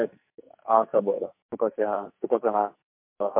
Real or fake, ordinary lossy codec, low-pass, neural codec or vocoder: fake; MP3, 32 kbps; 3.6 kHz; codec, 44.1 kHz, 2.6 kbps, SNAC